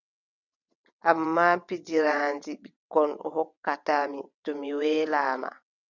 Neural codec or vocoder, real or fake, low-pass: vocoder, 22.05 kHz, 80 mel bands, WaveNeXt; fake; 7.2 kHz